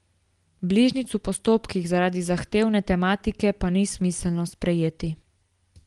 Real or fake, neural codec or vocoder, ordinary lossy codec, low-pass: real; none; Opus, 24 kbps; 10.8 kHz